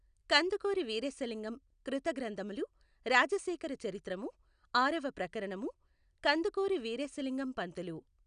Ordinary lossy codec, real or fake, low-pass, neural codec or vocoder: none; real; 9.9 kHz; none